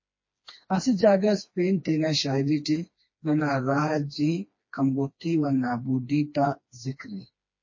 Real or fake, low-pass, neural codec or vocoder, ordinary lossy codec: fake; 7.2 kHz; codec, 16 kHz, 2 kbps, FreqCodec, smaller model; MP3, 32 kbps